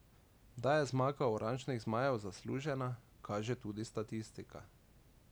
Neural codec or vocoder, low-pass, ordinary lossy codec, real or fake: none; none; none; real